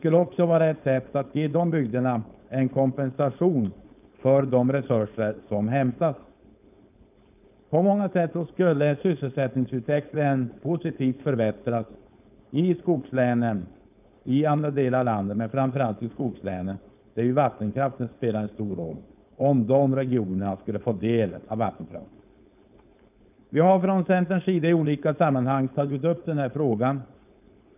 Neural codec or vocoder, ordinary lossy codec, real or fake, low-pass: codec, 16 kHz, 4.8 kbps, FACodec; none; fake; 3.6 kHz